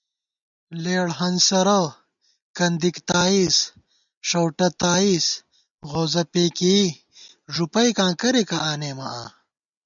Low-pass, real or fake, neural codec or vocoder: 7.2 kHz; real; none